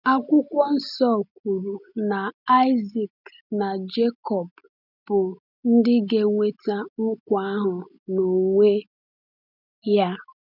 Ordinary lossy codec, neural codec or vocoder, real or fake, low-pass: none; none; real; 5.4 kHz